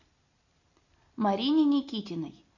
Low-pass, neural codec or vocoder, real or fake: 7.2 kHz; none; real